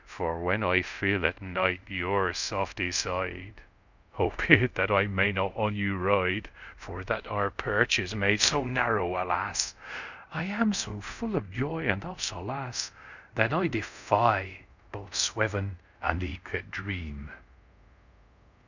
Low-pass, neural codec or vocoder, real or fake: 7.2 kHz; codec, 24 kHz, 0.5 kbps, DualCodec; fake